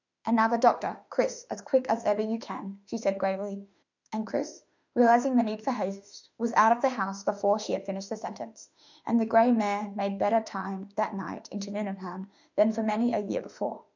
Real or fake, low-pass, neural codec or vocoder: fake; 7.2 kHz; autoencoder, 48 kHz, 32 numbers a frame, DAC-VAE, trained on Japanese speech